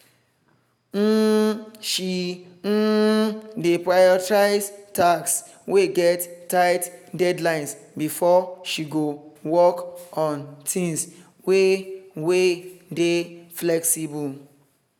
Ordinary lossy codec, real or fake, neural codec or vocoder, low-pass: none; real; none; 19.8 kHz